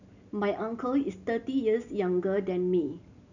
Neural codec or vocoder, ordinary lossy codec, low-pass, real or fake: none; none; 7.2 kHz; real